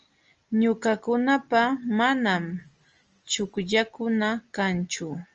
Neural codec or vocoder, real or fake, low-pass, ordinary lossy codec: none; real; 7.2 kHz; Opus, 24 kbps